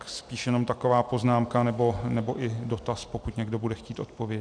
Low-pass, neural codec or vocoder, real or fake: 9.9 kHz; none; real